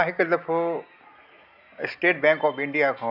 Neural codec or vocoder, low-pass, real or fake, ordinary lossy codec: none; 5.4 kHz; real; AAC, 48 kbps